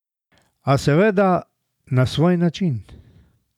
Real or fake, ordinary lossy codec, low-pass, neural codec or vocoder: real; none; 19.8 kHz; none